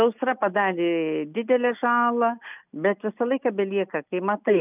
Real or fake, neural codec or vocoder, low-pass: real; none; 3.6 kHz